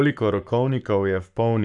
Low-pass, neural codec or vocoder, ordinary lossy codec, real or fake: 10.8 kHz; codec, 44.1 kHz, 7.8 kbps, Pupu-Codec; none; fake